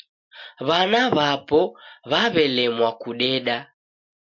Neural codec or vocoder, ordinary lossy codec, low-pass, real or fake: none; MP3, 48 kbps; 7.2 kHz; real